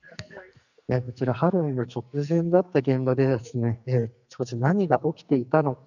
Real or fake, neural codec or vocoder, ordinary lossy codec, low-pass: fake; codec, 44.1 kHz, 2.6 kbps, SNAC; none; 7.2 kHz